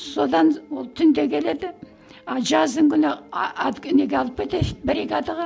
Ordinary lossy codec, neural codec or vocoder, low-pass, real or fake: none; none; none; real